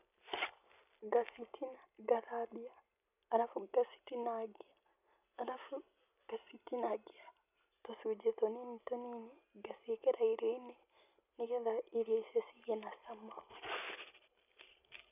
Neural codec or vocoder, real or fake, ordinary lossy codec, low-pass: none; real; none; 3.6 kHz